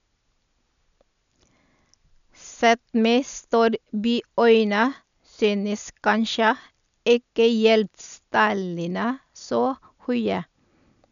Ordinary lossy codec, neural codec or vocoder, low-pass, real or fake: none; none; 7.2 kHz; real